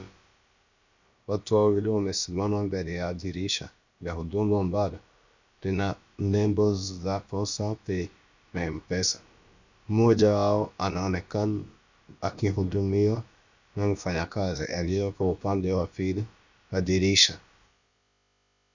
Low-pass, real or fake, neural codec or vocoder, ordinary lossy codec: 7.2 kHz; fake; codec, 16 kHz, about 1 kbps, DyCAST, with the encoder's durations; Opus, 64 kbps